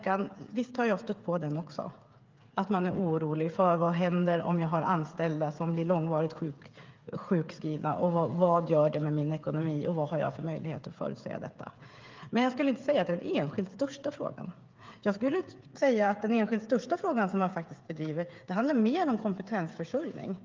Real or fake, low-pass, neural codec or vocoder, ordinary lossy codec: fake; 7.2 kHz; codec, 16 kHz, 8 kbps, FreqCodec, smaller model; Opus, 32 kbps